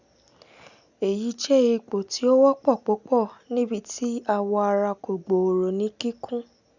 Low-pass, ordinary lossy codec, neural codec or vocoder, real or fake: 7.2 kHz; none; none; real